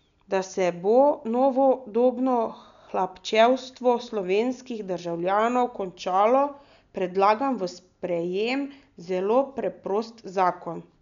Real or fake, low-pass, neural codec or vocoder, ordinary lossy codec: real; 7.2 kHz; none; none